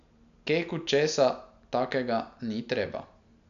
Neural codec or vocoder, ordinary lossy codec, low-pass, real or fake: none; none; 7.2 kHz; real